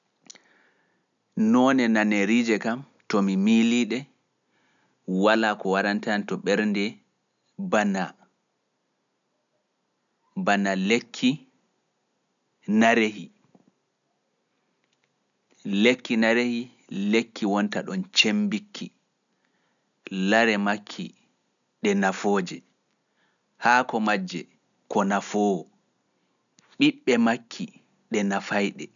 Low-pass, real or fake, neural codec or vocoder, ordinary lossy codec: 7.2 kHz; real; none; none